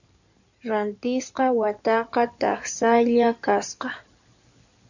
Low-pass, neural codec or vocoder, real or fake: 7.2 kHz; codec, 16 kHz in and 24 kHz out, 2.2 kbps, FireRedTTS-2 codec; fake